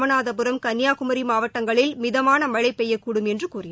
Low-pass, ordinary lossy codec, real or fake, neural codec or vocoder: none; none; real; none